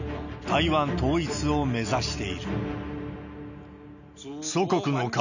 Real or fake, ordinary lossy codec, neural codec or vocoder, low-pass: real; none; none; 7.2 kHz